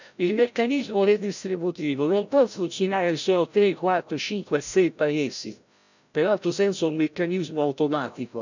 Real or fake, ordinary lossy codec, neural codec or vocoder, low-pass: fake; none; codec, 16 kHz, 0.5 kbps, FreqCodec, larger model; 7.2 kHz